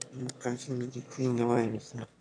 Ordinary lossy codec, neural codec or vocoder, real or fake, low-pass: none; autoencoder, 22.05 kHz, a latent of 192 numbers a frame, VITS, trained on one speaker; fake; 9.9 kHz